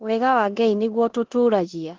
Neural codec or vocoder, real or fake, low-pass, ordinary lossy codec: codec, 16 kHz in and 24 kHz out, 0.9 kbps, LongCat-Audio-Codec, four codebook decoder; fake; 7.2 kHz; Opus, 16 kbps